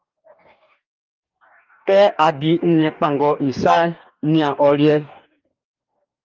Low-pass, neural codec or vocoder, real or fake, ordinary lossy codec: 7.2 kHz; codec, 44.1 kHz, 2.6 kbps, DAC; fake; Opus, 24 kbps